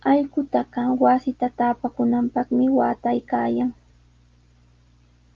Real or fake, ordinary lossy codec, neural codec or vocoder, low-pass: real; Opus, 32 kbps; none; 7.2 kHz